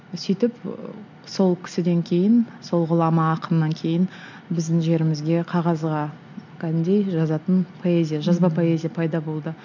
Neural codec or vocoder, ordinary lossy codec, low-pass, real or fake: none; none; 7.2 kHz; real